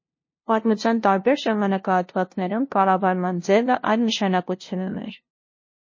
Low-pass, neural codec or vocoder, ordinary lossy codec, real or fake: 7.2 kHz; codec, 16 kHz, 0.5 kbps, FunCodec, trained on LibriTTS, 25 frames a second; MP3, 32 kbps; fake